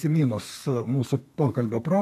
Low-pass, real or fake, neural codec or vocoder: 14.4 kHz; fake; codec, 32 kHz, 1.9 kbps, SNAC